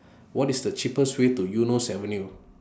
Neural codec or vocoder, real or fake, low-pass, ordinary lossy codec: none; real; none; none